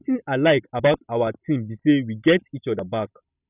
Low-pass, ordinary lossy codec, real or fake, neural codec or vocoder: 3.6 kHz; none; real; none